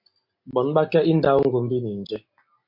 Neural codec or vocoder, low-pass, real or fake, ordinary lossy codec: none; 5.4 kHz; real; MP3, 32 kbps